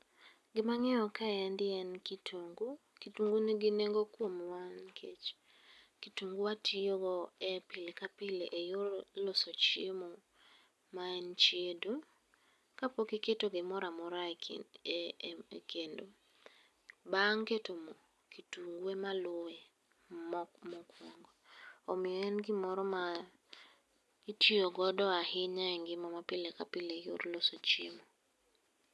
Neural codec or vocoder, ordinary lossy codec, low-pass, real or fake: none; none; none; real